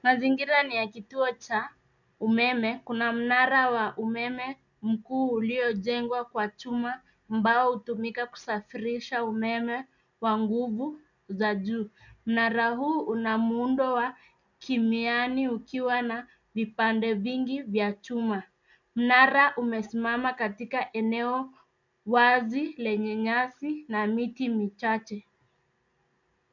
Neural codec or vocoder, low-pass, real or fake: none; 7.2 kHz; real